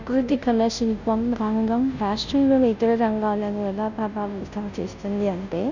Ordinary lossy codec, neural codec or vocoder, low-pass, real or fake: none; codec, 16 kHz, 0.5 kbps, FunCodec, trained on Chinese and English, 25 frames a second; 7.2 kHz; fake